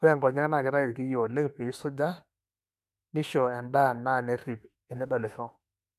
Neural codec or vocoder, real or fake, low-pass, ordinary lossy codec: autoencoder, 48 kHz, 32 numbers a frame, DAC-VAE, trained on Japanese speech; fake; 14.4 kHz; none